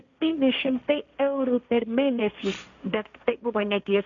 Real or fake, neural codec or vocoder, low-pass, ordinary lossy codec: fake; codec, 16 kHz, 1.1 kbps, Voila-Tokenizer; 7.2 kHz; MP3, 64 kbps